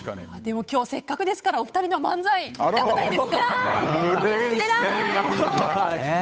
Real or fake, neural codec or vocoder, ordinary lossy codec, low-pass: fake; codec, 16 kHz, 8 kbps, FunCodec, trained on Chinese and English, 25 frames a second; none; none